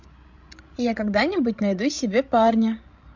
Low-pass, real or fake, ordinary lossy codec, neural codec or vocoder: 7.2 kHz; fake; MP3, 64 kbps; codec, 16 kHz, 16 kbps, FreqCodec, larger model